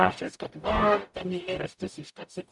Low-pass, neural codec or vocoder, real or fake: 10.8 kHz; codec, 44.1 kHz, 0.9 kbps, DAC; fake